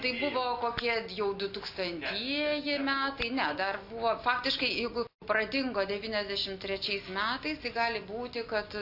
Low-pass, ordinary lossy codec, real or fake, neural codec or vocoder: 5.4 kHz; AAC, 48 kbps; real; none